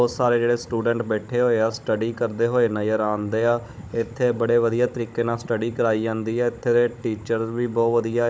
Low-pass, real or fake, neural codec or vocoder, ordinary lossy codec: none; fake; codec, 16 kHz, 16 kbps, FunCodec, trained on Chinese and English, 50 frames a second; none